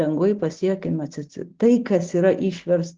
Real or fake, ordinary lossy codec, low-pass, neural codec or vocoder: real; Opus, 16 kbps; 7.2 kHz; none